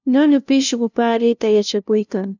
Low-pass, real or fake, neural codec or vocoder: 7.2 kHz; fake; codec, 16 kHz, 0.5 kbps, FunCodec, trained on LibriTTS, 25 frames a second